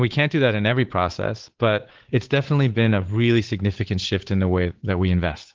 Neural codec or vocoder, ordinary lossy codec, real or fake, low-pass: codec, 16 kHz, 8 kbps, FunCodec, trained on Chinese and English, 25 frames a second; Opus, 16 kbps; fake; 7.2 kHz